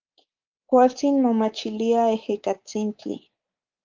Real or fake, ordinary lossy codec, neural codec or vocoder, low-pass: fake; Opus, 32 kbps; codec, 24 kHz, 3.1 kbps, DualCodec; 7.2 kHz